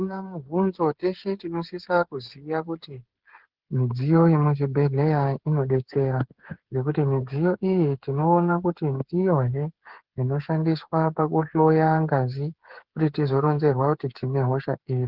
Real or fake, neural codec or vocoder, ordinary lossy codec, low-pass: fake; codec, 16 kHz, 8 kbps, FreqCodec, smaller model; Opus, 16 kbps; 5.4 kHz